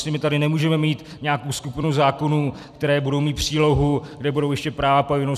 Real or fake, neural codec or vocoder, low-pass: fake; vocoder, 44.1 kHz, 128 mel bands every 256 samples, BigVGAN v2; 14.4 kHz